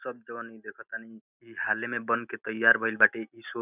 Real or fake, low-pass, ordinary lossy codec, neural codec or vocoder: real; 3.6 kHz; none; none